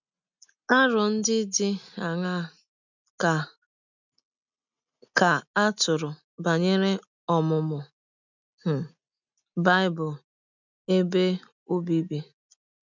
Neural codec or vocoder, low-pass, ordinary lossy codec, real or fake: none; 7.2 kHz; none; real